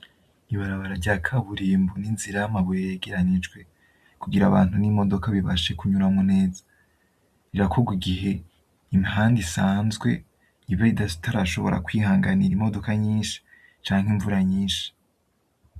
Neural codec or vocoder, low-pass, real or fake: none; 14.4 kHz; real